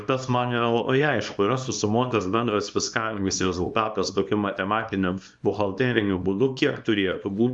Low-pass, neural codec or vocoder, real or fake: 10.8 kHz; codec, 24 kHz, 0.9 kbps, WavTokenizer, small release; fake